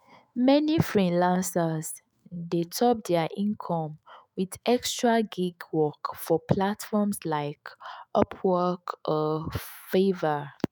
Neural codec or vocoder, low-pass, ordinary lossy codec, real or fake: autoencoder, 48 kHz, 128 numbers a frame, DAC-VAE, trained on Japanese speech; none; none; fake